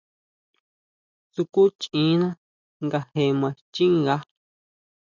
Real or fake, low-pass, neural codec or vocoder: real; 7.2 kHz; none